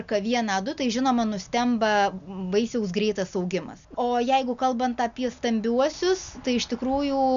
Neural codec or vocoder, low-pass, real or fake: none; 7.2 kHz; real